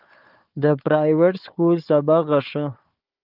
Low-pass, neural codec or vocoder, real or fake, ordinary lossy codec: 5.4 kHz; codec, 16 kHz, 4 kbps, FunCodec, trained on Chinese and English, 50 frames a second; fake; Opus, 32 kbps